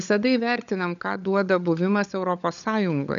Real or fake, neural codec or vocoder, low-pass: fake; codec, 16 kHz, 16 kbps, FunCodec, trained on LibriTTS, 50 frames a second; 7.2 kHz